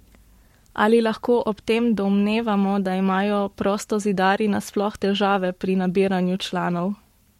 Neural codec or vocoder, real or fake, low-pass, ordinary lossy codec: codec, 44.1 kHz, 7.8 kbps, Pupu-Codec; fake; 19.8 kHz; MP3, 64 kbps